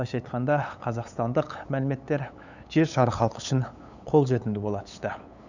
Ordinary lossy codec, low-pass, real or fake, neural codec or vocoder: none; 7.2 kHz; fake; codec, 16 kHz, 8 kbps, FunCodec, trained on LibriTTS, 25 frames a second